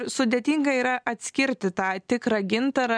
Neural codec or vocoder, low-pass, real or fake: none; 9.9 kHz; real